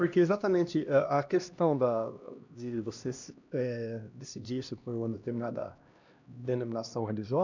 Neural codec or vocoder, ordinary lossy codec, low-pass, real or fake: codec, 16 kHz, 1 kbps, X-Codec, HuBERT features, trained on LibriSpeech; none; 7.2 kHz; fake